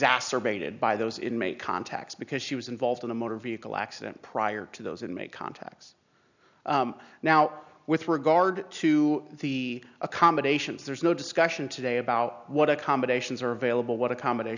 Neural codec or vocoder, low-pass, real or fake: none; 7.2 kHz; real